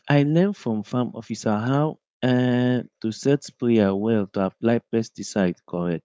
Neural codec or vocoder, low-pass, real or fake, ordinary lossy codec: codec, 16 kHz, 4.8 kbps, FACodec; none; fake; none